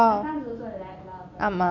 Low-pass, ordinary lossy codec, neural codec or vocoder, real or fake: 7.2 kHz; none; none; real